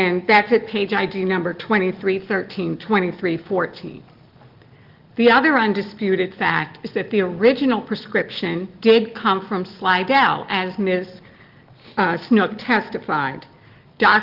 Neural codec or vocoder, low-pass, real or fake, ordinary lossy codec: none; 5.4 kHz; real; Opus, 16 kbps